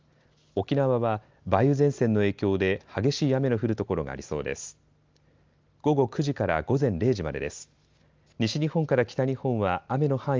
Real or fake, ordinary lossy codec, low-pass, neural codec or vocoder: real; Opus, 32 kbps; 7.2 kHz; none